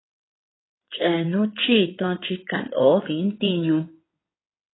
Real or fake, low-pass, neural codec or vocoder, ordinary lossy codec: fake; 7.2 kHz; codec, 16 kHz in and 24 kHz out, 2.2 kbps, FireRedTTS-2 codec; AAC, 16 kbps